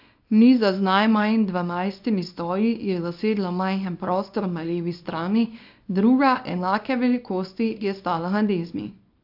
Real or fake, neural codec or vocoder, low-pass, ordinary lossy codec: fake; codec, 24 kHz, 0.9 kbps, WavTokenizer, small release; 5.4 kHz; AAC, 48 kbps